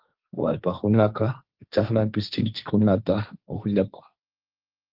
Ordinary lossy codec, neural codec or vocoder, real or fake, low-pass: Opus, 32 kbps; codec, 16 kHz, 1.1 kbps, Voila-Tokenizer; fake; 5.4 kHz